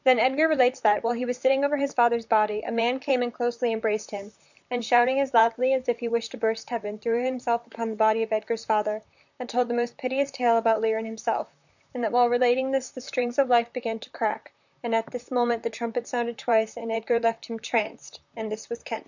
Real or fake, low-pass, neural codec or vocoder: fake; 7.2 kHz; vocoder, 44.1 kHz, 128 mel bands, Pupu-Vocoder